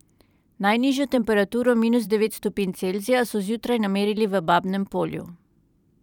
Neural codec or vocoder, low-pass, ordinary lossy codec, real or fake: vocoder, 44.1 kHz, 128 mel bands every 512 samples, BigVGAN v2; 19.8 kHz; none; fake